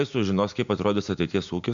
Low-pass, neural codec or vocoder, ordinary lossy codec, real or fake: 7.2 kHz; none; MP3, 64 kbps; real